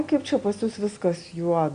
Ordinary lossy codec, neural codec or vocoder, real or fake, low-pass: Opus, 64 kbps; none; real; 9.9 kHz